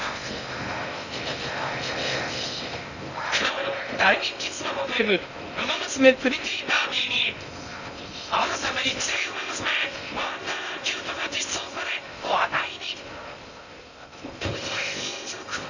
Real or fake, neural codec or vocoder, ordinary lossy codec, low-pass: fake; codec, 16 kHz in and 24 kHz out, 0.6 kbps, FocalCodec, streaming, 2048 codes; none; 7.2 kHz